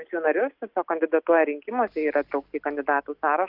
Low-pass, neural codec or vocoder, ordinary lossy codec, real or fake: 5.4 kHz; none; Opus, 32 kbps; real